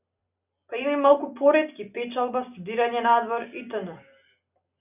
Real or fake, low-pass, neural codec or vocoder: real; 3.6 kHz; none